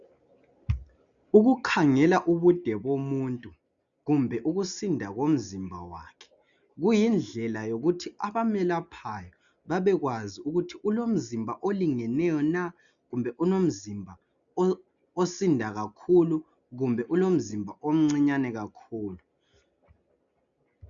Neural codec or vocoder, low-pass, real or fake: none; 7.2 kHz; real